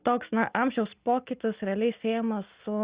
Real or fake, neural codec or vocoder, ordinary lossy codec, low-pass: real; none; Opus, 64 kbps; 3.6 kHz